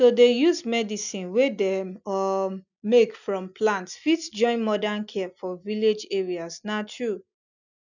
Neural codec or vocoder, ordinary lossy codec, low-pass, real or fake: none; none; 7.2 kHz; real